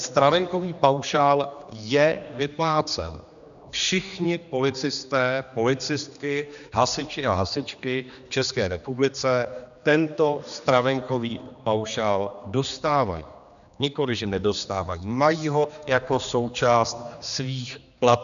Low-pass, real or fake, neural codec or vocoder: 7.2 kHz; fake; codec, 16 kHz, 2 kbps, X-Codec, HuBERT features, trained on general audio